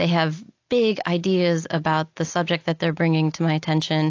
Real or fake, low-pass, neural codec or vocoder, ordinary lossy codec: real; 7.2 kHz; none; AAC, 48 kbps